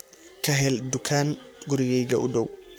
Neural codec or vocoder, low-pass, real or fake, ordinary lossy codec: codec, 44.1 kHz, 7.8 kbps, Pupu-Codec; none; fake; none